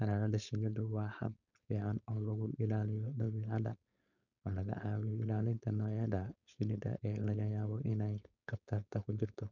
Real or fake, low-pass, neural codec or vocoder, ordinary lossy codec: fake; 7.2 kHz; codec, 16 kHz, 4.8 kbps, FACodec; none